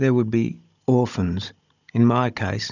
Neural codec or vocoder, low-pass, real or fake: codec, 16 kHz, 16 kbps, FunCodec, trained on Chinese and English, 50 frames a second; 7.2 kHz; fake